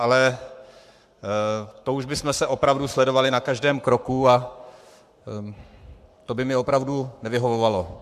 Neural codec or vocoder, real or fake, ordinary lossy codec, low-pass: codec, 44.1 kHz, 7.8 kbps, Pupu-Codec; fake; MP3, 96 kbps; 14.4 kHz